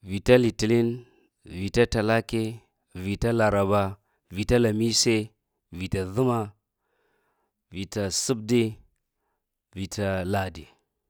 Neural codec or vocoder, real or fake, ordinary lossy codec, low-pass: none; real; none; none